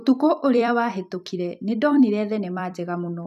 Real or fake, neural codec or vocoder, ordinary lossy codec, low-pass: fake; vocoder, 44.1 kHz, 128 mel bands every 256 samples, BigVGAN v2; none; 14.4 kHz